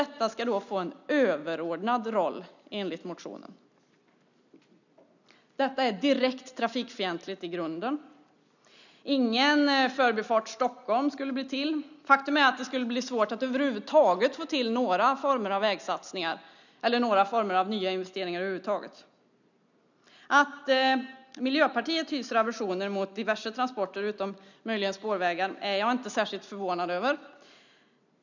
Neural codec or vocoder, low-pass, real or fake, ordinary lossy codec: none; 7.2 kHz; real; none